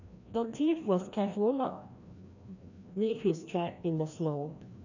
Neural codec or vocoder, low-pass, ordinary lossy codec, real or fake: codec, 16 kHz, 1 kbps, FreqCodec, larger model; 7.2 kHz; none; fake